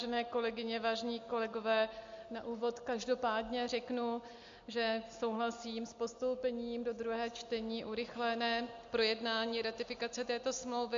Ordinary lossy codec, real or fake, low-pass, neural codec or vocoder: MP3, 48 kbps; real; 7.2 kHz; none